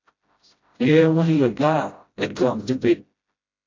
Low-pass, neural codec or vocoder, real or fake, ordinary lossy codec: 7.2 kHz; codec, 16 kHz, 0.5 kbps, FreqCodec, smaller model; fake; AAC, 48 kbps